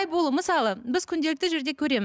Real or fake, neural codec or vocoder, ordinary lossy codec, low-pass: real; none; none; none